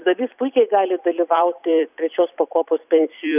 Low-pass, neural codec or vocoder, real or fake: 3.6 kHz; vocoder, 44.1 kHz, 128 mel bands every 256 samples, BigVGAN v2; fake